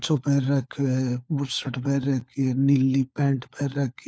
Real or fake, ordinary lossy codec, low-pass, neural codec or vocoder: fake; none; none; codec, 16 kHz, 4 kbps, FunCodec, trained on LibriTTS, 50 frames a second